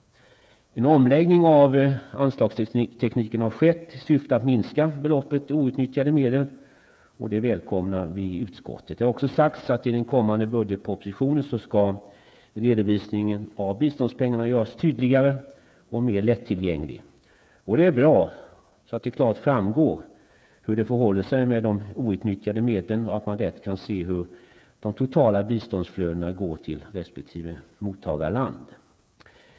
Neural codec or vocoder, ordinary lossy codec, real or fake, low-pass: codec, 16 kHz, 8 kbps, FreqCodec, smaller model; none; fake; none